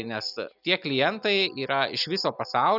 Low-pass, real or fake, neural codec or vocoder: 5.4 kHz; real; none